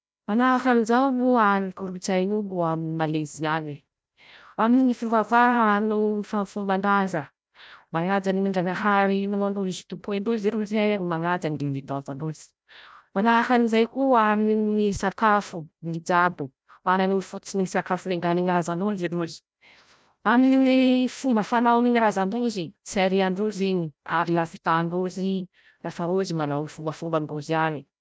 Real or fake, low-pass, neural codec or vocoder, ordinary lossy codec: fake; none; codec, 16 kHz, 0.5 kbps, FreqCodec, larger model; none